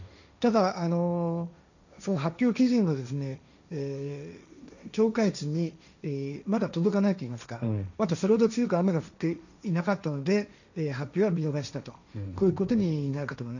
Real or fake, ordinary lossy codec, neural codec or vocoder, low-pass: fake; none; codec, 16 kHz, 1.1 kbps, Voila-Tokenizer; 7.2 kHz